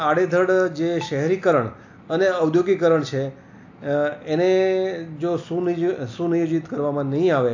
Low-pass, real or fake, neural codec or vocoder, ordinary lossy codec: 7.2 kHz; real; none; AAC, 48 kbps